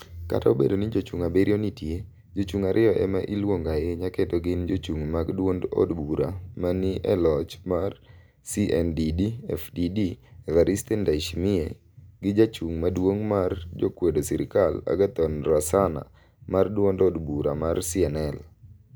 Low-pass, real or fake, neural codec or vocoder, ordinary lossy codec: none; real; none; none